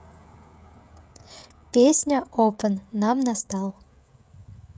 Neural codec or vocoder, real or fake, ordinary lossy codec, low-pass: codec, 16 kHz, 16 kbps, FreqCodec, smaller model; fake; none; none